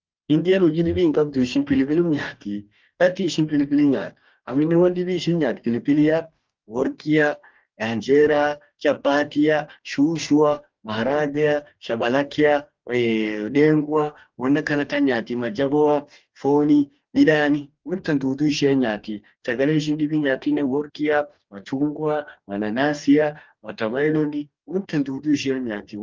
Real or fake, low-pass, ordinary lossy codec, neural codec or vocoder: fake; 7.2 kHz; Opus, 24 kbps; codec, 44.1 kHz, 2.6 kbps, DAC